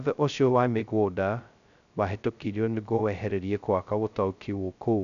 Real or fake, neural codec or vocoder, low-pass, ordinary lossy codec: fake; codec, 16 kHz, 0.2 kbps, FocalCodec; 7.2 kHz; none